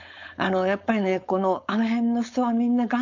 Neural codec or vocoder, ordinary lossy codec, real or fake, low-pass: codec, 16 kHz, 4.8 kbps, FACodec; none; fake; 7.2 kHz